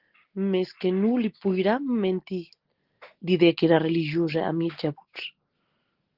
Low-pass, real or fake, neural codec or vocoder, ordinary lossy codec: 5.4 kHz; real; none; Opus, 16 kbps